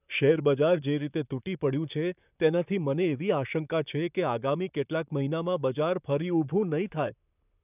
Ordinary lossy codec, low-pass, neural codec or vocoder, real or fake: none; 3.6 kHz; vocoder, 44.1 kHz, 128 mel bands, Pupu-Vocoder; fake